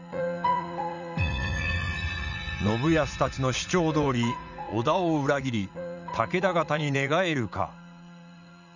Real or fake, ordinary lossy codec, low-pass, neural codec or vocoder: fake; none; 7.2 kHz; vocoder, 44.1 kHz, 80 mel bands, Vocos